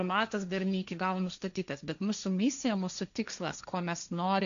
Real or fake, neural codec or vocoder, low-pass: fake; codec, 16 kHz, 1.1 kbps, Voila-Tokenizer; 7.2 kHz